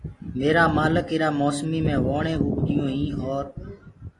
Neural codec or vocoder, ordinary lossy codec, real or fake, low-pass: none; AAC, 32 kbps; real; 10.8 kHz